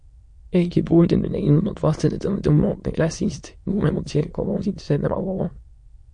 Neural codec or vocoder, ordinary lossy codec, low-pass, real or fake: autoencoder, 22.05 kHz, a latent of 192 numbers a frame, VITS, trained on many speakers; MP3, 48 kbps; 9.9 kHz; fake